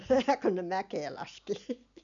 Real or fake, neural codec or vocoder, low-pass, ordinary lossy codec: real; none; 7.2 kHz; none